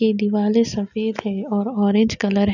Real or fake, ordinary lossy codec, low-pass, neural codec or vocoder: real; none; 7.2 kHz; none